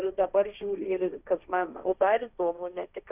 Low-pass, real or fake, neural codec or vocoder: 3.6 kHz; fake; codec, 16 kHz, 1.1 kbps, Voila-Tokenizer